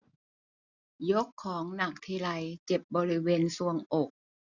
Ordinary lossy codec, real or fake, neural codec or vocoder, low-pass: none; real; none; 7.2 kHz